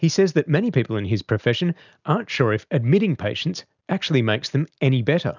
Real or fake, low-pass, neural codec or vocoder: real; 7.2 kHz; none